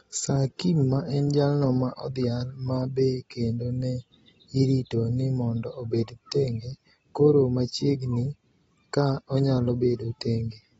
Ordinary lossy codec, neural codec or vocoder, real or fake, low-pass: AAC, 24 kbps; none; real; 19.8 kHz